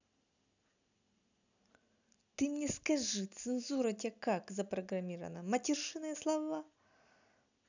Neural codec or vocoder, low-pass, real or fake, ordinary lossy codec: none; 7.2 kHz; real; none